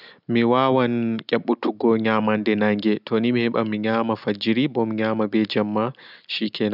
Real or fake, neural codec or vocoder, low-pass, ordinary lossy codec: real; none; 5.4 kHz; none